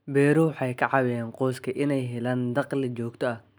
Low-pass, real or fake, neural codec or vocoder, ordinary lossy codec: none; real; none; none